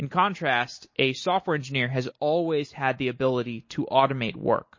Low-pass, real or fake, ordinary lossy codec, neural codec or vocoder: 7.2 kHz; real; MP3, 32 kbps; none